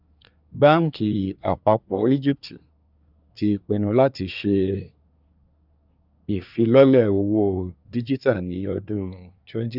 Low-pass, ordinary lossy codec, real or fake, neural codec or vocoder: 5.4 kHz; none; fake; codec, 24 kHz, 1 kbps, SNAC